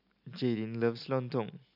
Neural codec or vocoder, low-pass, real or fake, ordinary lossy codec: none; 5.4 kHz; real; none